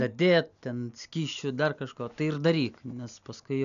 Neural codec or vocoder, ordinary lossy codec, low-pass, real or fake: none; MP3, 96 kbps; 7.2 kHz; real